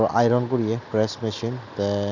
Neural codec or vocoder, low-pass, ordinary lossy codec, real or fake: none; 7.2 kHz; none; real